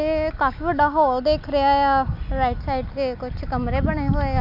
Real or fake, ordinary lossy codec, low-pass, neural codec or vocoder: real; none; 5.4 kHz; none